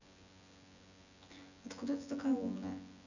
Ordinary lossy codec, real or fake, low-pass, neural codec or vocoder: none; fake; 7.2 kHz; vocoder, 24 kHz, 100 mel bands, Vocos